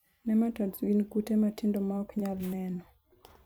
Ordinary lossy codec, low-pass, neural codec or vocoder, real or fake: none; none; vocoder, 44.1 kHz, 128 mel bands every 256 samples, BigVGAN v2; fake